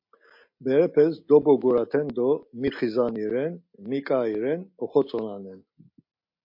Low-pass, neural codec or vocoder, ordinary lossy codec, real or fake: 5.4 kHz; none; MP3, 32 kbps; real